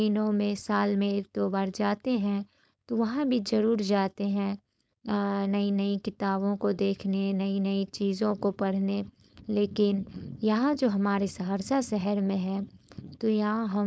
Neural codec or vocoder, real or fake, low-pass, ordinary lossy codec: codec, 16 kHz, 4.8 kbps, FACodec; fake; none; none